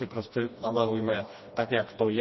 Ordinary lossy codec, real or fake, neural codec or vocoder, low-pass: MP3, 24 kbps; fake; codec, 16 kHz, 1 kbps, FreqCodec, smaller model; 7.2 kHz